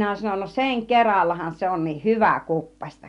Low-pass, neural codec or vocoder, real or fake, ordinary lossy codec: 10.8 kHz; none; real; none